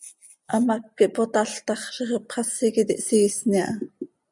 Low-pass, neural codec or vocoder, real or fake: 10.8 kHz; none; real